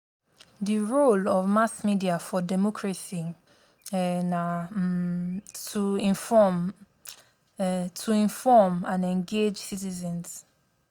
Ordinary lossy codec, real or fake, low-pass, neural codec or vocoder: none; real; none; none